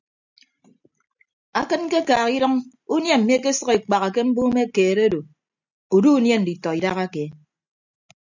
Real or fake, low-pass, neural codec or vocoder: real; 7.2 kHz; none